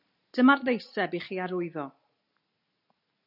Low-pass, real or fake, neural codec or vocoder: 5.4 kHz; real; none